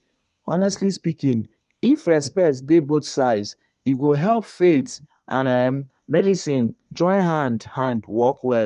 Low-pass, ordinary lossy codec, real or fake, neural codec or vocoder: 10.8 kHz; none; fake; codec, 24 kHz, 1 kbps, SNAC